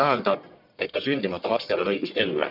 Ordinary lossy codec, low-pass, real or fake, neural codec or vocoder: none; 5.4 kHz; fake; codec, 44.1 kHz, 1.7 kbps, Pupu-Codec